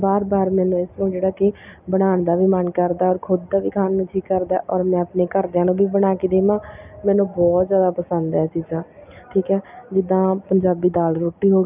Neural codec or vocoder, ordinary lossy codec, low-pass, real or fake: none; none; 3.6 kHz; real